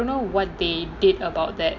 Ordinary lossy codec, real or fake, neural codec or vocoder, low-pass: MP3, 48 kbps; real; none; 7.2 kHz